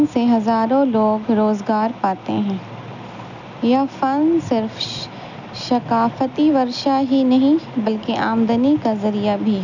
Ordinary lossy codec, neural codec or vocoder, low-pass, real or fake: none; none; 7.2 kHz; real